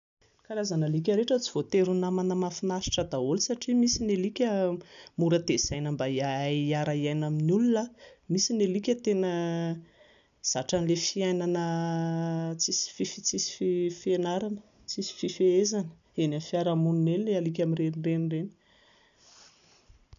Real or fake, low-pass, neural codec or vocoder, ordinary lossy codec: real; 7.2 kHz; none; none